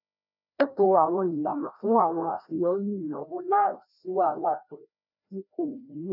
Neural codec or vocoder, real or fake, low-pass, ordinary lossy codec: codec, 16 kHz, 1 kbps, FreqCodec, larger model; fake; 5.4 kHz; none